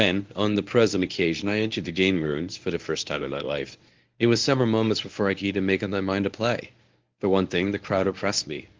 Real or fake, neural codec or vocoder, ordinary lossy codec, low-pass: fake; codec, 24 kHz, 0.9 kbps, WavTokenizer, medium speech release version 1; Opus, 32 kbps; 7.2 kHz